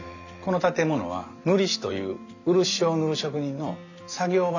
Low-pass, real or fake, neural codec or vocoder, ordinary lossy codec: 7.2 kHz; real; none; none